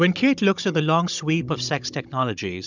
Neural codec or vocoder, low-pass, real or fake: codec, 16 kHz, 16 kbps, FunCodec, trained on Chinese and English, 50 frames a second; 7.2 kHz; fake